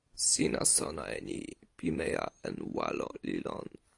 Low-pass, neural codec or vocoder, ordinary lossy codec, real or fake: 10.8 kHz; none; AAC, 48 kbps; real